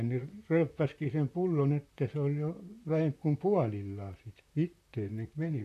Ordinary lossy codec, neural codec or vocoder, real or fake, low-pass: AAC, 64 kbps; vocoder, 44.1 kHz, 128 mel bands, Pupu-Vocoder; fake; 14.4 kHz